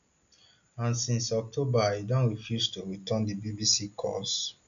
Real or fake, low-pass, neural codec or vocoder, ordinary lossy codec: real; 7.2 kHz; none; none